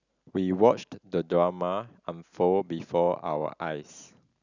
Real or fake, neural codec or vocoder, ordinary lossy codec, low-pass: real; none; none; 7.2 kHz